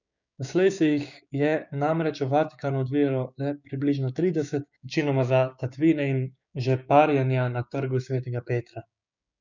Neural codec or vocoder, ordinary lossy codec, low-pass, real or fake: codec, 16 kHz, 6 kbps, DAC; none; 7.2 kHz; fake